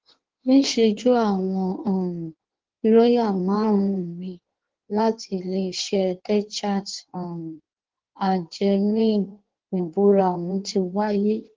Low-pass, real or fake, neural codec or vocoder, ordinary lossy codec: 7.2 kHz; fake; codec, 16 kHz in and 24 kHz out, 1.1 kbps, FireRedTTS-2 codec; Opus, 16 kbps